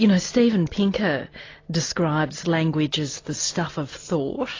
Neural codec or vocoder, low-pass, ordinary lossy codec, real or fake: none; 7.2 kHz; AAC, 32 kbps; real